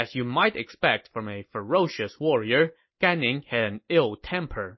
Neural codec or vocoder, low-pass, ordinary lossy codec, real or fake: none; 7.2 kHz; MP3, 24 kbps; real